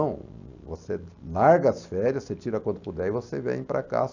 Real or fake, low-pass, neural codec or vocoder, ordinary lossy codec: real; 7.2 kHz; none; none